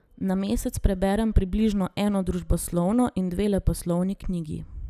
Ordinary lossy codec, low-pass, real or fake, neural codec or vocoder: none; 14.4 kHz; real; none